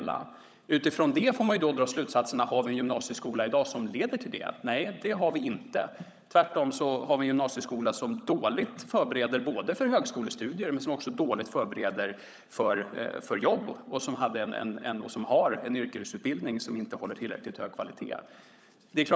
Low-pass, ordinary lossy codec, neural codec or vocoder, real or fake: none; none; codec, 16 kHz, 16 kbps, FunCodec, trained on LibriTTS, 50 frames a second; fake